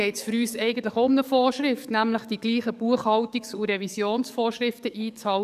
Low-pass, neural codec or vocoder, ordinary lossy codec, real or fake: 14.4 kHz; codec, 44.1 kHz, 7.8 kbps, DAC; none; fake